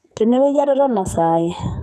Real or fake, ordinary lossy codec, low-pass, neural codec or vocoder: fake; none; 14.4 kHz; codec, 44.1 kHz, 2.6 kbps, SNAC